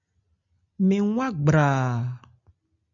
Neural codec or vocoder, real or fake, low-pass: none; real; 7.2 kHz